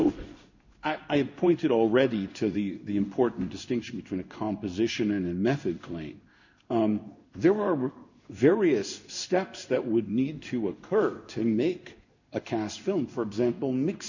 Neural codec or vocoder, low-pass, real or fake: codec, 16 kHz in and 24 kHz out, 1 kbps, XY-Tokenizer; 7.2 kHz; fake